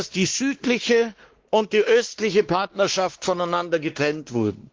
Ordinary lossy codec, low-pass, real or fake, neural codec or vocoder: Opus, 16 kbps; 7.2 kHz; fake; codec, 16 kHz, 2 kbps, X-Codec, WavLM features, trained on Multilingual LibriSpeech